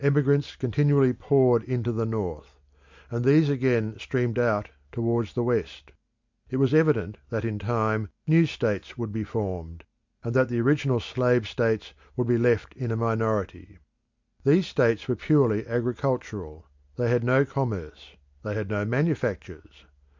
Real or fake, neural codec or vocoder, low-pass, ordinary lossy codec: real; none; 7.2 kHz; MP3, 64 kbps